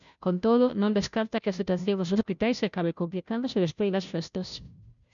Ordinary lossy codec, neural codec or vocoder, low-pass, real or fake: MP3, 96 kbps; codec, 16 kHz, 0.5 kbps, FunCodec, trained on Chinese and English, 25 frames a second; 7.2 kHz; fake